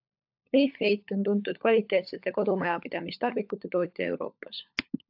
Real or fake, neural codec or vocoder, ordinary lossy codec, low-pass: fake; codec, 16 kHz, 16 kbps, FunCodec, trained on LibriTTS, 50 frames a second; AAC, 48 kbps; 5.4 kHz